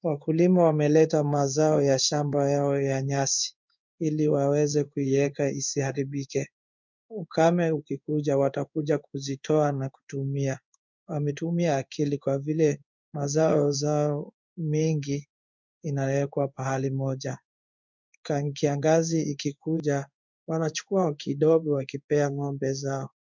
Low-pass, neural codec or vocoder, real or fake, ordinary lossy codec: 7.2 kHz; codec, 16 kHz in and 24 kHz out, 1 kbps, XY-Tokenizer; fake; MP3, 64 kbps